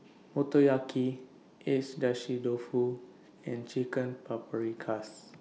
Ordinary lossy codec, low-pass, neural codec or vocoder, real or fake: none; none; none; real